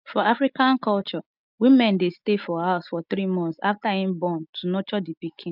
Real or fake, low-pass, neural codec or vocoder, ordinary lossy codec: real; 5.4 kHz; none; none